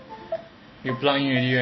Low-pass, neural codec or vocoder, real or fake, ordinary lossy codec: 7.2 kHz; none; real; MP3, 24 kbps